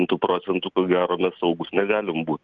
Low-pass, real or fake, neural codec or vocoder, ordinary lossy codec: 7.2 kHz; real; none; Opus, 32 kbps